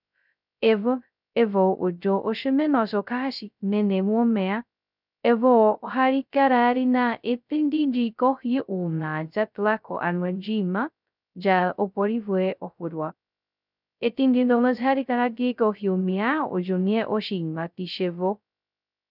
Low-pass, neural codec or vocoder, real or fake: 5.4 kHz; codec, 16 kHz, 0.2 kbps, FocalCodec; fake